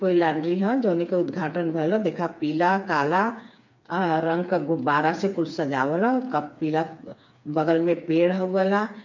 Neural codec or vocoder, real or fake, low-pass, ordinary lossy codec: codec, 16 kHz, 4 kbps, FreqCodec, smaller model; fake; 7.2 kHz; MP3, 48 kbps